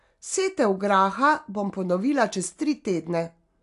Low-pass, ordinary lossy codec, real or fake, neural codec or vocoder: 10.8 kHz; AAC, 64 kbps; real; none